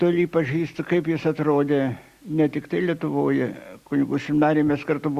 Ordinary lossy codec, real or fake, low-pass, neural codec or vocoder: Opus, 64 kbps; real; 14.4 kHz; none